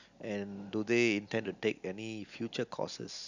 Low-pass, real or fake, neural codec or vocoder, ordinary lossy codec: 7.2 kHz; real; none; none